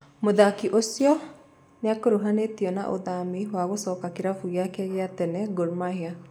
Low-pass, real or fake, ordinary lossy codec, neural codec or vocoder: 19.8 kHz; real; none; none